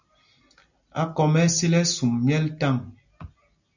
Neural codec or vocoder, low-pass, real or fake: none; 7.2 kHz; real